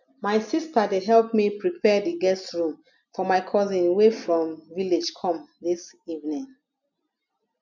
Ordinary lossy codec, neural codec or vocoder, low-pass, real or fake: none; none; 7.2 kHz; real